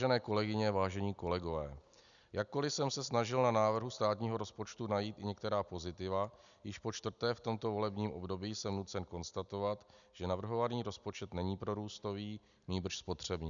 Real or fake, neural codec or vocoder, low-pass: real; none; 7.2 kHz